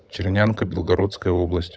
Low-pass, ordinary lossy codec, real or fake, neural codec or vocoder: none; none; fake; codec, 16 kHz, 16 kbps, FunCodec, trained on LibriTTS, 50 frames a second